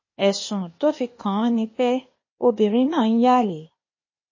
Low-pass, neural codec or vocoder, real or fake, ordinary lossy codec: 7.2 kHz; codec, 16 kHz, 0.8 kbps, ZipCodec; fake; MP3, 32 kbps